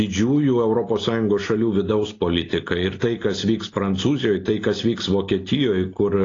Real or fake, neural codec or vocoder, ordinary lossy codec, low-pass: real; none; AAC, 32 kbps; 7.2 kHz